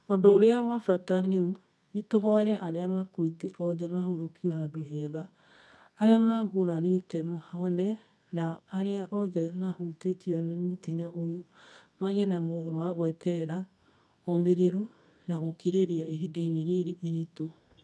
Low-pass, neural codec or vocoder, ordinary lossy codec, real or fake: none; codec, 24 kHz, 0.9 kbps, WavTokenizer, medium music audio release; none; fake